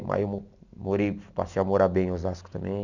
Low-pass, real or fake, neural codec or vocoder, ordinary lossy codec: 7.2 kHz; real; none; none